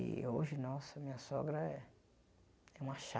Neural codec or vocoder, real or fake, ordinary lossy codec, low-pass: none; real; none; none